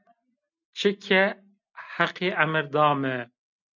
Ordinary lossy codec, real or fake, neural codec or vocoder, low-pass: MP3, 64 kbps; real; none; 7.2 kHz